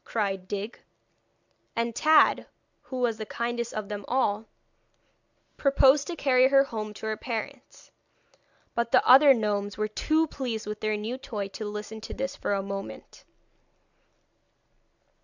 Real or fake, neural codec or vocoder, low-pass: real; none; 7.2 kHz